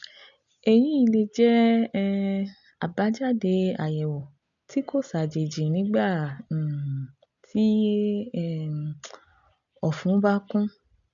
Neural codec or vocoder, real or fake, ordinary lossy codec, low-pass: none; real; none; 7.2 kHz